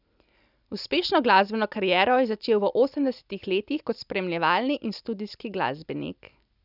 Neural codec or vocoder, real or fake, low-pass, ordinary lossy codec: none; real; 5.4 kHz; none